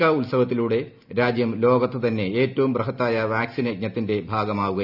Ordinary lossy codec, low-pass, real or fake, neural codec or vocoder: none; 5.4 kHz; real; none